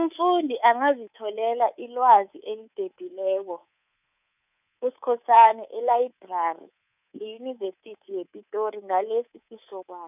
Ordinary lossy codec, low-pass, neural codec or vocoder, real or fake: none; 3.6 kHz; codec, 24 kHz, 3.1 kbps, DualCodec; fake